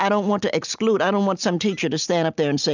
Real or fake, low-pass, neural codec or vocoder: real; 7.2 kHz; none